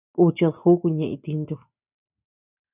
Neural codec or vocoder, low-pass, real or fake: none; 3.6 kHz; real